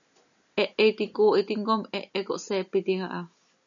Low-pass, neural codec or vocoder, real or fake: 7.2 kHz; none; real